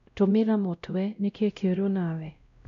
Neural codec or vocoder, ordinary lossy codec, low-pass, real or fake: codec, 16 kHz, 0.5 kbps, X-Codec, WavLM features, trained on Multilingual LibriSpeech; none; 7.2 kHz; fake